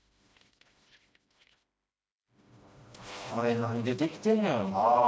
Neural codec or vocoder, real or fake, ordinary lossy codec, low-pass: codec, 16 kHz, 1 kbps, FreqCodec, smaller model; fake; none; none